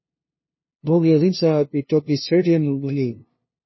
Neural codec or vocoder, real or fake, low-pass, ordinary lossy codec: codec, 16 kHz, 0.5 kbps, FunCodec, trained on LibriTTS, 25 frames a second; fake; 7.2 kHz; MP3, 24 kbps